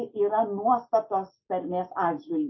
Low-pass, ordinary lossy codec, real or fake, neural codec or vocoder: 7.2 kHz; MP3, 24 kbps; real; none